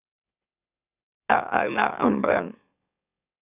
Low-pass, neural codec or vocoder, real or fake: 3.6 kHz; autoencoder, 44.1 kHz, a latent of 192 numbers a frame, MeloTTS; fake